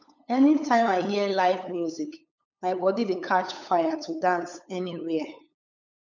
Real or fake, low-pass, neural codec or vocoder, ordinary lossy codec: fake; 7.2 kHz; codec, 16 kHz, 8 kbps, FunCodec, trained on LibriTTS, 25 frames a second; none